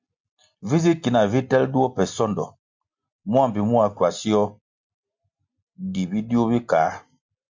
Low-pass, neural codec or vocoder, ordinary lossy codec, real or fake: 7.2 kHz; none; MP3, 64 kbps; real